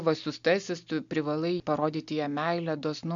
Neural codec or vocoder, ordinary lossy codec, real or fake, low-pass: none; MP3, 64 kbps; real; 7.2 kHz